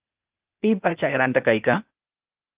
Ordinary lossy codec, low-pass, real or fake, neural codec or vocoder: Opus, 32 kbps; 3.6 kHz; fake; codec, 16 kHz, 0.8 kbps, ZipCodec